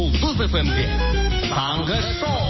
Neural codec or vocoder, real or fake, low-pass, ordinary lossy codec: none; real; 7.2 kHz; MP3, 24 kbps